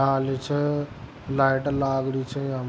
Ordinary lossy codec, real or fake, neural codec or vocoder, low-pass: none; real; none; none